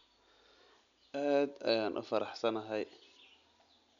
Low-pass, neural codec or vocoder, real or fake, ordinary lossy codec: 7.2 kHz; none; real; MP3, 96 kbps